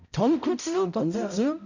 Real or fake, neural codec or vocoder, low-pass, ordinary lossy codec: fake; codec, 16 kHz, 0.5 kbps, X-Codec, HuBERT features, trained on balanced general audio; 7.2 kHz; none